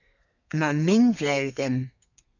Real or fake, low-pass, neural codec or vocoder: fake; 7.2 kHz; codec, 32 kHz, 1.9 kbps, SNAC